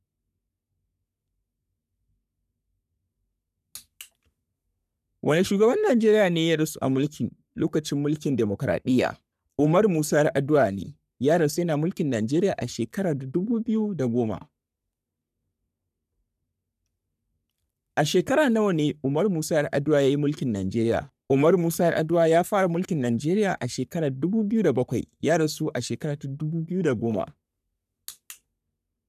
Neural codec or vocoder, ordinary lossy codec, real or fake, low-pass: codec, 44.1 kHz, 3.4 kbps, Pupu-Codec; none; fake; 14.4 kHz